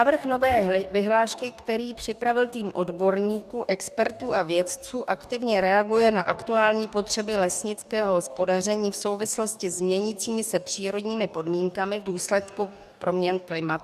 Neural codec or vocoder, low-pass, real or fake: codec, 44.1 kHz, 2.6 kbps, DAC; 14.4 kHz; fake